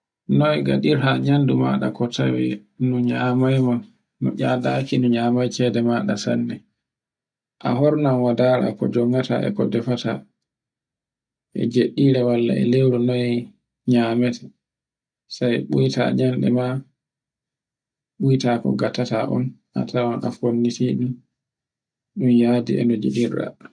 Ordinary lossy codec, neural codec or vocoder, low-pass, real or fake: none; none; 9.9 kHz; real